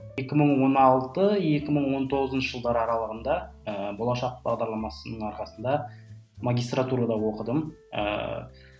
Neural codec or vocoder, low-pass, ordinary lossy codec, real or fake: none; none; none; real